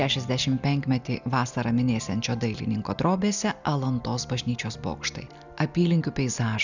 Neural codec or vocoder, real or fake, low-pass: none; real; 7.2 kHz